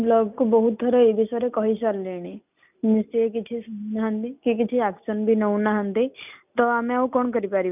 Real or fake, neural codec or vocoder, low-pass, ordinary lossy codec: real; none; 3.6 kHz; none